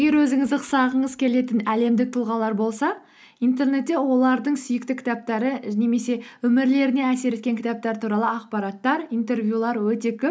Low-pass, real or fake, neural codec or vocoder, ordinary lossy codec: none; real; none; none